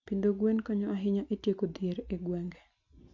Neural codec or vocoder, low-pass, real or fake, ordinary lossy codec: none; 7.2 kHz; real; none